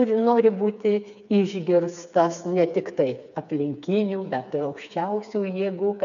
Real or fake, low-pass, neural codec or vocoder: fake; 7.2 kHz; codec, 16 kHz, 4 kbps, FreqCodec, smaller model